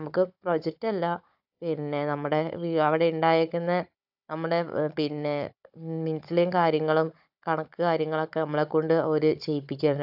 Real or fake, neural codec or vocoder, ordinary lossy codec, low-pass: fake; codec, 16 kHz, 16 kbps, FunCodec, trained on Chinese and English, 50 frames a second; AAC, 48 kbps; 5.4 kHz